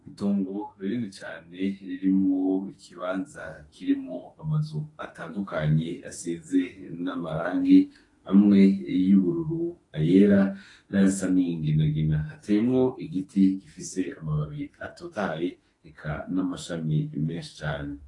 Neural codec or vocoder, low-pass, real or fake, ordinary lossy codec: autoencoder, 48 kHz, 32 numbers a frame, DAC-VAE, trained on Japanese speech; 10.8 kHz; fake; AAC, 32 kbps